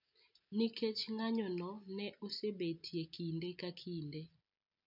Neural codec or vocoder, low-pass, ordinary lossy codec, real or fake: none; 5.4 kHz; none; real